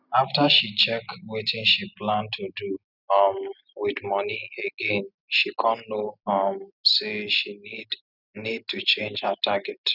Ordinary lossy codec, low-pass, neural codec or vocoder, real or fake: none; 5.4 kHz; none; real